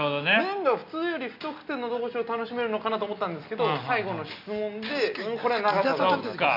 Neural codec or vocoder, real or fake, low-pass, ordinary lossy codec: none; real; 5.4 kHz; none